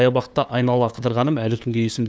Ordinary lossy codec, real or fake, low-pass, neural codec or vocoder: none; fake; none; codec, 16 kHz, 2 kbps, FunCodec, trained on LibriTTS, 25 frames a second